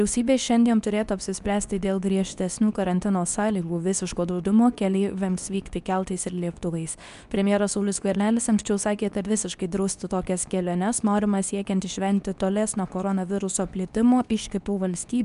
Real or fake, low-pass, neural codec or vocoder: fake; 10.8 kHz; codec, 24 kHz, 0.9 kbps, WavTokenizer, medium speech release version 2